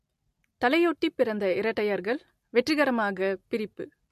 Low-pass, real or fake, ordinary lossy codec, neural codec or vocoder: 14.4 kHz; real; MP3, 64 kbps; none